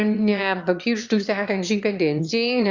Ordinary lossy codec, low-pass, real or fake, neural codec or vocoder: Opus, 64 kbps; 7.2 kHz; fake; autoencoder, 22.05 kHz, a latent of 192 numbers a frame, VITS, trained on one speaker